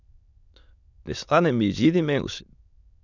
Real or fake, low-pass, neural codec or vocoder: fake; 7.2 kHz; autoencoder, 22.05 kHz, a latent of 192 numbers a frame, VITS, trained on many speakers